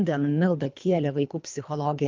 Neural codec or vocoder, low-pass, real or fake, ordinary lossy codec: codec, 24 kHz, 3 kbps, HILCodec; 7.2 kHz; fake; Opus, 32 kbps